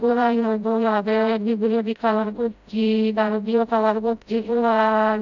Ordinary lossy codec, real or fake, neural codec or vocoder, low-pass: none; fake; codec, 16 kHz, 0.5 kbps, FreqCodec, smaller model; 7.2 kHz